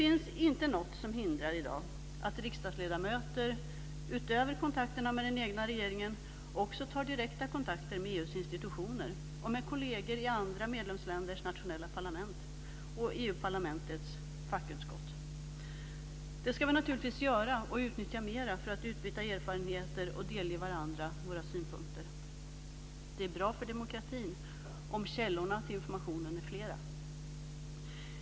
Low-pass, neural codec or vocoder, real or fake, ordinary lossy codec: none; none; real; none